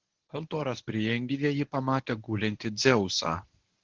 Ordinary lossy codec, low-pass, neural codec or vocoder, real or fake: Opus, 16 kbps; 7.2 kHz; codec, 24 kHz, 0.9 kbps, WavTokenizer, medium speech release version 1; fake